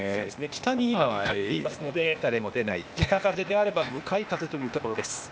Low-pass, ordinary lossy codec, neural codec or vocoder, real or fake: none; none; codec, 16 kHz, 0.8 kbps, ZipCodec; fake